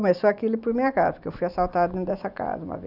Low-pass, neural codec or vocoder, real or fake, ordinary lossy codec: 5.4 kHz; none; real; none